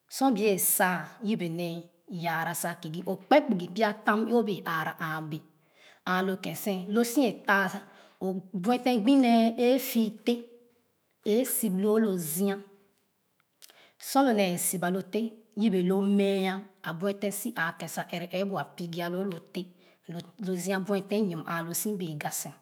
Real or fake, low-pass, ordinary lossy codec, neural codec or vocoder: fake; none; none; autoencoder, 48 kHz, 128 numbers a frame, DAC-VAE, trained on Japanese speech